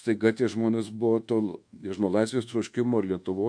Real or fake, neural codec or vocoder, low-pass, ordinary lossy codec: fake; codec, 24 kHz, 1.2 kbps, DualCodec; 9.9 kHz; MP3, 64 kbps